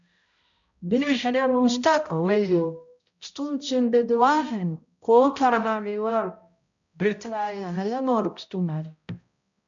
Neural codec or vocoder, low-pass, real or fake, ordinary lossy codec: codec, 16 kHz, 0.5 kbps, X-Codec, HuBERT features, trained on balanced general audio; 7.2 kHz; fake; MP3, 64 kbps